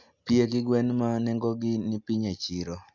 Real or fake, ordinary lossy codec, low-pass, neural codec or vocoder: real; none; 7.2 kHz; none